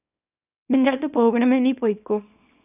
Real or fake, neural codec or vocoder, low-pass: fake; codec, 24 kHz, 0.9 kbps, WavTokenizer, small release; 3.6 kHz